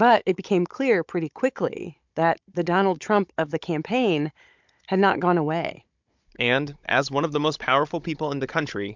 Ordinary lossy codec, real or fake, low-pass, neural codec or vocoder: MP3, 64 kbps; fake; 7.2 kHz; codec, 16 kHz, 16 kbps, FunCodec, trained on Chinese and English, 50 frames a second